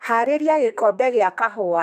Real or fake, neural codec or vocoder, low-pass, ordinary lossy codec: fake; codec, 32 kHz, 1.9 kbps, SNAC; 14.4 kHz; none